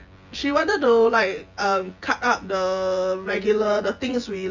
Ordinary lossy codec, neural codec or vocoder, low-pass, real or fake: Opus, 32 kbps; vocoder, 24 kHz, 100 mel bands, Vocos; 7.2 kHz; fake